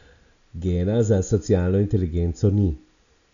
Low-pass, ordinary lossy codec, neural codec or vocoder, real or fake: 7.2 kHz; none; none; real